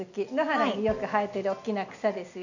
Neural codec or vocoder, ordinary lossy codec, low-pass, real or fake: none; none; 7.2 kHz; real